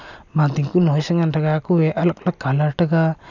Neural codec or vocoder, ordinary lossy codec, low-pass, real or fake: none; none; 7.2 kHz; real